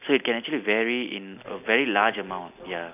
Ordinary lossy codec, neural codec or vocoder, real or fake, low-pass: none; none; real; 3.6 kHz